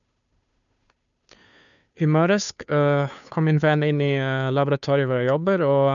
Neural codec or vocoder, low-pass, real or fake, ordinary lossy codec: codec, 16 kHz, 2 kbps, FunCodec, trained on Chinese and English, 25 frames a second; 7.2 kHz; fake; none